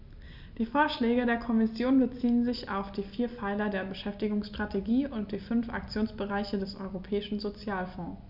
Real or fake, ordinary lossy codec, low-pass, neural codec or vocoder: real; none; 5.4 kHz; none